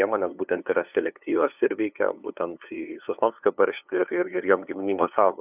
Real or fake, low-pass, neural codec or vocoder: fake; 3.6 kHz; codec, 16 kHz, 2 kbps, FunCodec, trained on LibriTTS, 25 frames a second